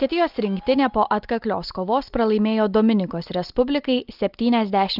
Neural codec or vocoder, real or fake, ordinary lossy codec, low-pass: none; real; Opus, 24 kbps; 5.4 kHz